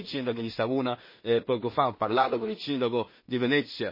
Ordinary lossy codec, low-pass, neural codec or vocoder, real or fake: MP3, 24 kbps; 5.4 kHz; codec, 16 kHz in and 24 kHz out, 0.4 kbps, LongCat-Audio-Codec, two codebook decoder; fake